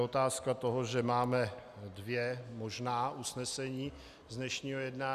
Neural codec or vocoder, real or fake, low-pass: none; real; 14.4 kHz